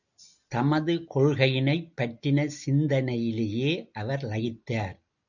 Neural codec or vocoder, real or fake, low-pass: none; real; 7.2 kHz